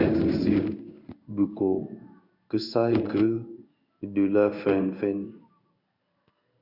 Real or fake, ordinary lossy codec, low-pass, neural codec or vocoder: fake; Opus, 64 kbps; 5.4 kHz; codec, 16 kHz in and 24 kHz out, 1 kbps, XY-Tokenizer